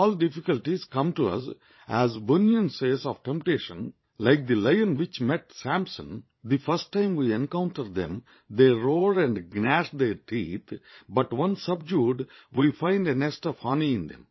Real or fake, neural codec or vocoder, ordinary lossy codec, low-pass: fake; vocoder, 22.05 kHz, 80 mel bands, Vocos; MP3, 24 kbps; 7.2 kHz